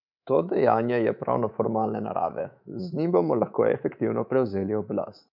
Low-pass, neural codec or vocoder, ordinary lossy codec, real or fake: 5.4 kHz; codec, 24 kHz, 3.1 kbps, DualCodec; none; fake